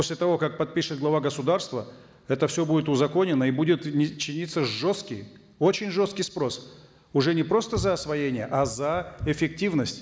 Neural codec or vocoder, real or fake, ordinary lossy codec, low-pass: none; real; none; none